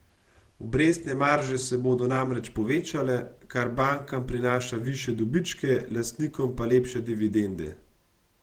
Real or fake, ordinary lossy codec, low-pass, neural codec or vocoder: fake; Opus, 16 kbps; 19.8 kHz; vocoder, 48 kHz, 128 mel bands, Vocos